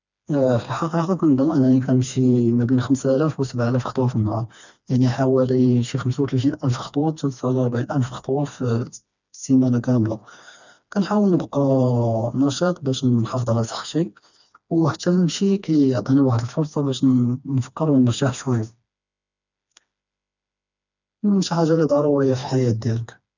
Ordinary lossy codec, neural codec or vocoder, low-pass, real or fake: none; codec, 16 kHz, 2 kbps, FreqCodec, smaller model; 7.2 kHz; fake